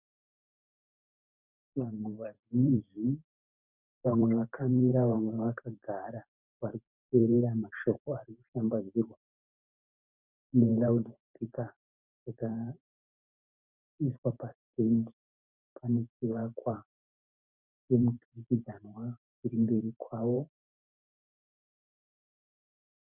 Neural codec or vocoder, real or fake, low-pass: vocoder, 44.1 kHz, 128 mel bands every 512 samples, BigVGAN v2; fake; 3.6 kHz